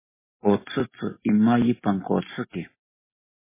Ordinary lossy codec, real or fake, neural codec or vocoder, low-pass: MP3, 16 kbps; real; none; 3.6 kHz